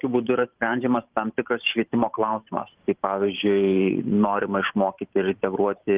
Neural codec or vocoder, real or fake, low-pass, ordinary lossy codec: vocoder, 44.1 kHz, 128 mel bands every 512 samples, BigVGAN v2; fake; 3.6 kHz; Opus, 32 kbps